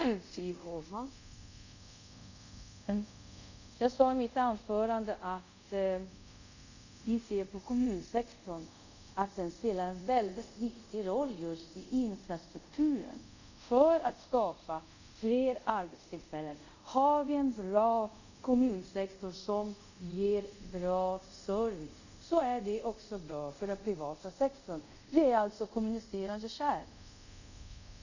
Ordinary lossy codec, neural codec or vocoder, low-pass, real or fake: none; codec, 24 kHz, 0.5 kbps, DualCodec; 7.2 kHz; fake